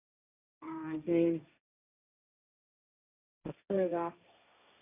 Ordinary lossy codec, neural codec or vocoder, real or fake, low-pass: none; codec, 16 kHz, 1.1 kbps, Voila-Tokenizer; fake; 3.6 kHz